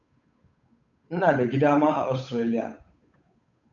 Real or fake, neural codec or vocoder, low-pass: fake; codec, 16 kHz, 8 kbps, FunCodec, trained on Chinese and English, 25 frames a second; 7.2 kHz